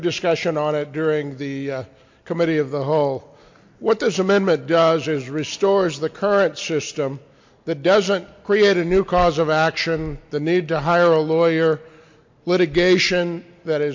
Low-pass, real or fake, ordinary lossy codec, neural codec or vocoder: 7.2 kHz; real; MP3, 48 kbps; none